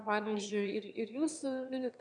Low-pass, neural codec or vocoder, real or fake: 9.9 kHz; autoencoder, 22.05 kHz, a latent of 192 numbers a frame, VITS, trained on one speaker; fake